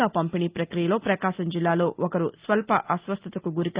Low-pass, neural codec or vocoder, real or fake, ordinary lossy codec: 3.6 kHz; none; real; Opus, 32 kbps